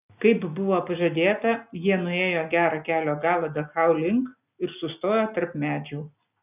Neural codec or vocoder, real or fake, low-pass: none; real; 3.6 kHz